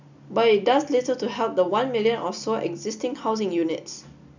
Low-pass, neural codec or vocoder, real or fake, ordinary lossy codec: 7.2 kHz; none; real; none